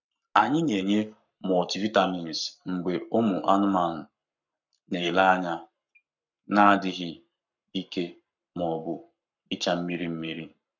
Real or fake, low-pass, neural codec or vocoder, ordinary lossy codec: fake; 7.2 kHz; codec, 44.1 kHz, 7.8 kbps, Pupu-Codec; none